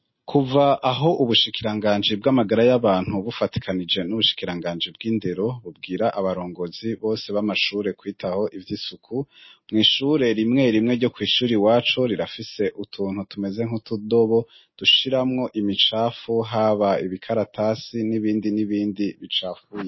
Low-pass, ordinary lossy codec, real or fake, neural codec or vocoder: 7.2 kHz; MP3, 24 kbps; real; none